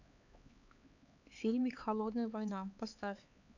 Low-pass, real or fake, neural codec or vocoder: 7.2 kHz; fake; codec, 16 kHz, 4 kbps, X-Codec, HuBERT features, trained on LibriSpeech